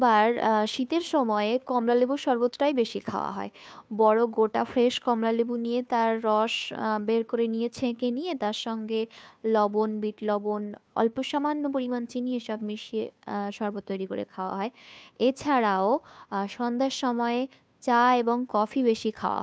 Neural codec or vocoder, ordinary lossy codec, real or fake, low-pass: codec, 16 kHz, 2 kbps, FunCodec, trained on Chinese and English, 25 frames a second; none; fake; none